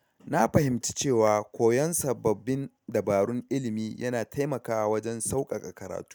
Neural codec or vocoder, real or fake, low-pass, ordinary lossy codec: none; real; none; none